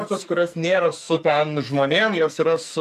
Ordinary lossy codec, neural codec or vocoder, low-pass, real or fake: MP3, 96 kbps; codec, 44.1 kHz, 2.6 kbps, SNAC; 14.4 kHz; fake